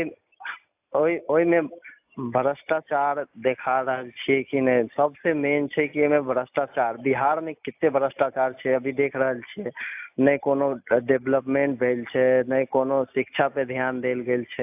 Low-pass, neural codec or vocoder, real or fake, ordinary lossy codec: 3.6 kHz; none; real; AAC, 32 kbps